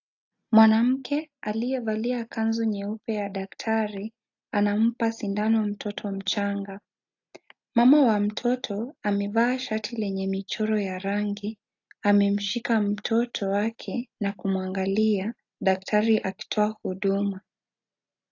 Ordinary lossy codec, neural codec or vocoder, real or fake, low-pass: AAC, 48 kbps; none; real; 7.2 kHz